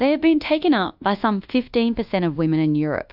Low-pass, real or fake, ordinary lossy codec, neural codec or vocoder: 5.4 kHz; fake; AAC, 48 kbps; codec, 24 kHz, 1.2 kbps, DualCodec